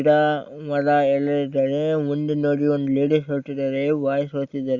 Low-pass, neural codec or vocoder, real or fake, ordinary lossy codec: 7.2 kHz; none; real; Opus, 64 kbps